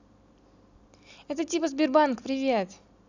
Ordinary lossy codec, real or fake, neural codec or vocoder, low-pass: none; real; none; 7.2 kHz